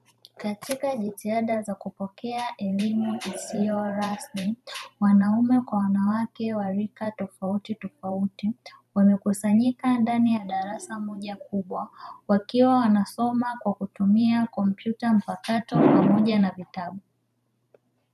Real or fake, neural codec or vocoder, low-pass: fake; vocoder, 44.1 kHz, 128 mel bands every 512 samples, BigVGAN v2; 14.4 kHz